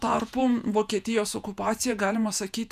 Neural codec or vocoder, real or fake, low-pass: vocoder, 48 kHz, 128 mel bands, Vocos; fake; 14.4 kHz